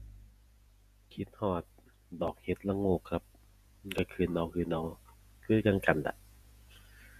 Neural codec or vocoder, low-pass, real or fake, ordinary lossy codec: vocoder, 48 kHz, 128 mel bands, Vocos; 14.4 kHz; fake; none